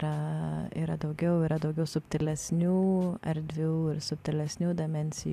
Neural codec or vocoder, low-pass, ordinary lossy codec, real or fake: none; 14.4 kHz; MP3, 96 kbps; real